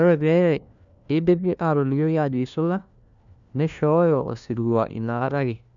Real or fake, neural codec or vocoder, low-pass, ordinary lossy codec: fake; codec, 16 kHz, 1 kbps, FunCodec, trained on LibriTTS, 50 frames a second; 7.2 kHz; MP3, 96 kbps